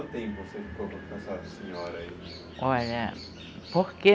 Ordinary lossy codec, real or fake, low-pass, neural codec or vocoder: none; real; none; none